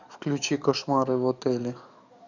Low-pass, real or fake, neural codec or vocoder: 7.2 kHz; fake; codec, 44.1 kHz, 7.8 kbps, DAC